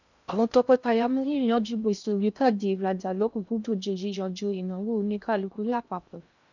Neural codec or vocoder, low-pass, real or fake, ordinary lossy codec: codec, 16 kHz in and 24 kHz out, 0.6 kbps, FocalCodec, streaming, 2048 codes; 7.2 kHz; fake; none